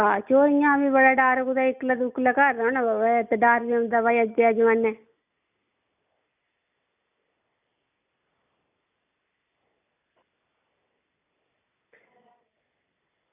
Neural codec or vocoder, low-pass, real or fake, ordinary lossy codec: none; 3.6 kHz; real; none